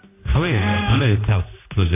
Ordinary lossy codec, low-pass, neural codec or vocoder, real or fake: AAC, 24 kbps; 3.6 kHz; codec, 16 kHz, 1 kbps, X-Codec, HuBERT features, trained on general audio; fake